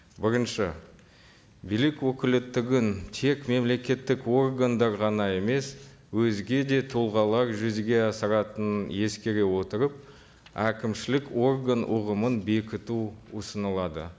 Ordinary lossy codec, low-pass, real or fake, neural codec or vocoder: none; none; real; none